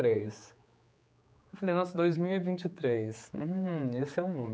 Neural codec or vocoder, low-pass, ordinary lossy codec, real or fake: codec, 16 kHz, 4 kbps, X-Codec, HuBERT features, trained on general audio; none; none; fake